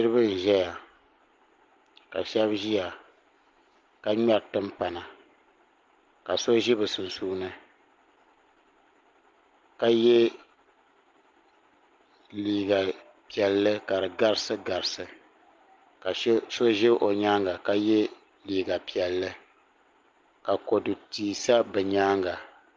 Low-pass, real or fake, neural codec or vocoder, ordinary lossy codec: 7.2 kHz; real; none; Opus, 24 kbps